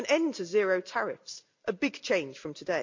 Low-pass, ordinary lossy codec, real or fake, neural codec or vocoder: 7.2 kHz; MP3, 64 kbps; real; none